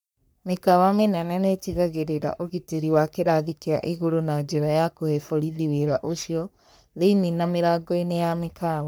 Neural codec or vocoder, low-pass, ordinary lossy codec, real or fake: codec, 44.1 kHz, 3.4 kbps, Pupu-Codec; none; none; fake